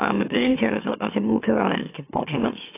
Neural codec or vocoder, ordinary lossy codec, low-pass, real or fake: autoencoder, 44.1 kHz, a latent of 192 numbers a frame, MeloTTS; AAC, 24 kbps; 3.6 kHz; fake